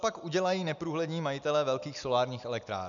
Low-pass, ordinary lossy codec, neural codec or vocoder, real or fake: 7.2 kHz; MP3, 96 kbps; none; real